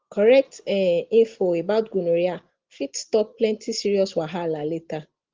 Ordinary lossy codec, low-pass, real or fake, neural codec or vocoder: Opus, 16 kbps; 7.2 kHz; real; none